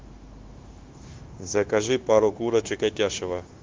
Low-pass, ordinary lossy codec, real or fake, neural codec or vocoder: 7.2 kHz; Opus, 16 kbps; fake; codec, 16 kHz, 0.9 kbps, LongCat-Audio-Codec